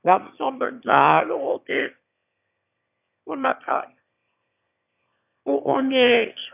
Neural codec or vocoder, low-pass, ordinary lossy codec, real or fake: autoencoder, 22.05 kHz, a latent of 192 numbers a frame, VITS, trained on one speaker; 3.6 kHz; none; fake